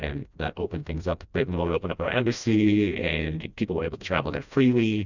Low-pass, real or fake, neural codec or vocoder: 7.2 kHz; fake; codec, 16 kHz, 1 kbps, FreqCodec, smaller model